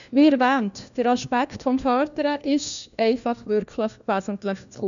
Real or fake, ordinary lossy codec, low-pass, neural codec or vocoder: fake; none; 7.2 kHz; codec, 16 kHz, 1 kbps, FunCodec, trained on LibriTTS, 50 frames a second